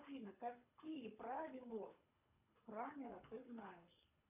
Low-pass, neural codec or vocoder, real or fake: 3.6 kHz; codec, 24 kHz, 3 kbps, HILCodec; fake